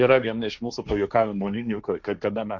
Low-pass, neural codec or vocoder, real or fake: 7.2 kHz; codec, 16 kHz, 1.1 kbps, Voila-Tokenizer; fake